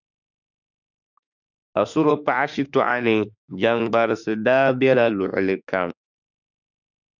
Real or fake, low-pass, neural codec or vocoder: fake; 7.2 kHz; autoencoder, 48 kHz, 32 numbers a frame, DAC-VAE, trained on Japanese speech